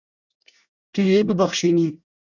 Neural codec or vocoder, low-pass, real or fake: codec, 24 kHz, 1 kbps, SNAC; 7.2 kHz; fake